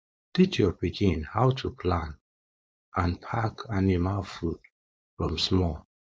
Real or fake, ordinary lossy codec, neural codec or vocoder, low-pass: fake; none; codec, 16 kHz, 4.8 kbps, FACodec; none